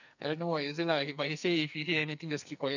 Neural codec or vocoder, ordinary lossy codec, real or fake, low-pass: codec, 44.1 kHz, 2.6 kbps, SNAC; none; fake; 7.2 kHz